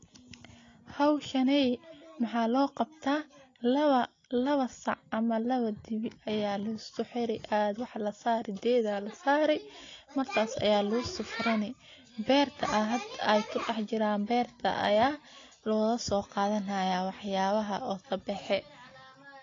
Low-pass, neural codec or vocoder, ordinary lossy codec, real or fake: 7.2 kHz; none; AAC, 32 kbps; real